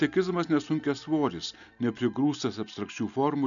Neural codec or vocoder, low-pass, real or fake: none; 7.2 kHz; real